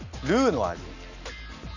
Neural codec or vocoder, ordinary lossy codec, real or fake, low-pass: none; none; real; 7.2 kHz